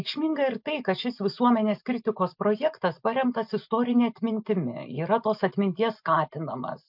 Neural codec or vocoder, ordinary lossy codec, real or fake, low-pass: none; MP3, 48 kbps; real; 5.4 kHz